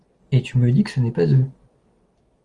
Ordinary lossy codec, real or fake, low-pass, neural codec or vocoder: Opus, 24 kbps; real; 10.8 kHz; none